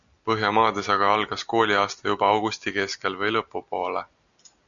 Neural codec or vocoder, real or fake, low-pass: none; real; 7.2 kHz